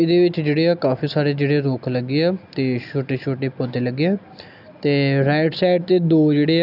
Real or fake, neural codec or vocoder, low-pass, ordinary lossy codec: real; none; 5.4 kHz; none